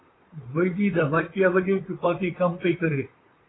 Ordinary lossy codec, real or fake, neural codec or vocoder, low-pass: AAC, 16 kbps; fake; vocoder, 44.1 kHz, 128 mel bands, Pupu-Vocoder; 7.2 kHz